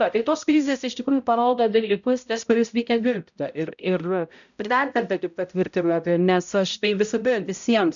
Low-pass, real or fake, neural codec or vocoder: 7.2 kHz; fake; codec, 16 kHz, 0.5 kbps, X-Codec, HuBERT features, trained on balanced general audio